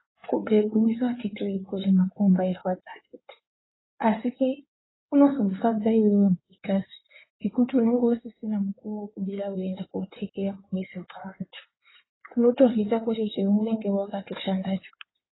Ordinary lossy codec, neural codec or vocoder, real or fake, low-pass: AAC, 16 kbps; codec, 16 kHz in and 24 kHz out, 2.2 kbps, FireRedTTS-2 codec; fake; 7.2 kHz